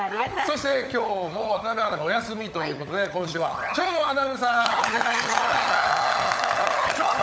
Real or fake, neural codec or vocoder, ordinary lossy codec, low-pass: fake; codec, 16 kHz, 16 kbps, FunCodec, trained on LibriTTS, 50 frames a second; none; none